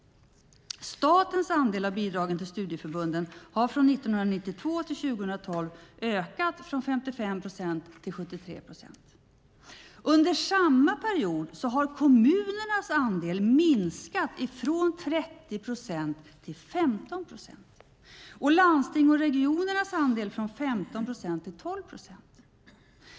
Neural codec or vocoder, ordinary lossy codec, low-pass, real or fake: none; none; none; real